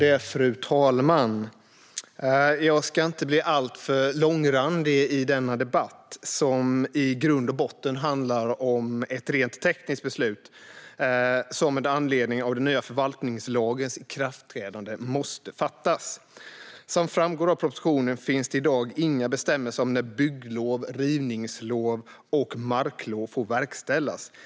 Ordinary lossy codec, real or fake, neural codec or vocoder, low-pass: none; real; none; none